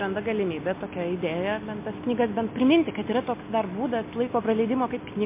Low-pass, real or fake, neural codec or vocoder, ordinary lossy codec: 3.6 kHz; real; none; MP3, 24 kbps